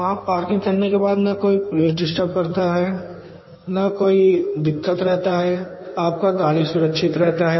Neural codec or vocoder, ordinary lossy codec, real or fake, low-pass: codec, 16 kHz in and 24 kHz out, 1.1 kbps, FireRedTTS-2 codec; MP3, 24 kbps; fake; 7.2 kHz